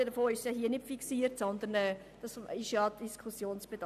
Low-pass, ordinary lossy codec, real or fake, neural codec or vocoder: 14.4 kHz; none; real; none